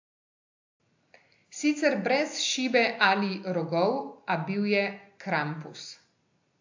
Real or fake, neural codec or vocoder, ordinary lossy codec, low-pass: real; none; AAC, 48 kbps; 7.2 kHz